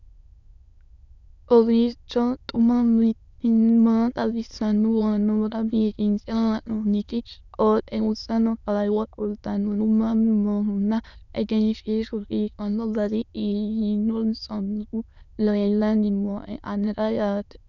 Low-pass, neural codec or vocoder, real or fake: 7.2 kHz; autoencoder, 22.05 kHz, a latent of 192 numbers a frame, VITS, trained on many speakers; fake